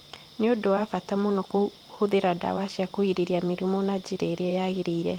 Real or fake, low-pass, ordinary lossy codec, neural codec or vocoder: fake; 19.8 kHz; Opus, 24 kbps; vocoder, 44.1 kHz, 128 mel bands every 512 samples, BigVGAN v2